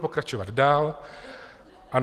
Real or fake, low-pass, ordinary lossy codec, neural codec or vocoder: real; 14.4 kHz; Opus, 24 kbps; none